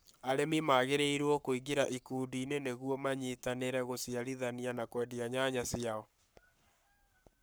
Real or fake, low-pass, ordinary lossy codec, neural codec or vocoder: fake; none; none; codec, 44.1 kHz, 7.8 kbps, Pupu-Codec